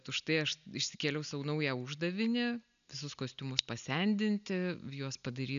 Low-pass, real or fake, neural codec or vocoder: 7.2 kHz; real; none